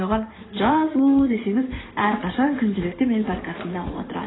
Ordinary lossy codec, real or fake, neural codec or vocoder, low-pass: AAC, 16 kbps; fake; codec, 16 kHz in and 24 kHz out, 2.2 kbps, FireRedTTS-2 codec; 7.2 kHz